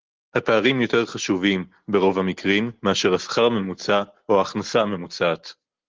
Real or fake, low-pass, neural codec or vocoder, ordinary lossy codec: real; 7.2 kHz; none; Opus, 16 kbps